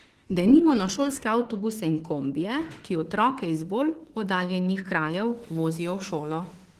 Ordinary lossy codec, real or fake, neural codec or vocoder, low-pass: Opus, 16 kbps; fake; autoencoder, 48 kHz, 32 numbers a frame, DAC-VAE, trained on Japanese speech; 14.4 kHz